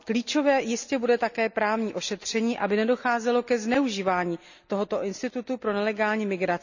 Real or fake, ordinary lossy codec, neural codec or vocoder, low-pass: real; none; none; 7.2 kHz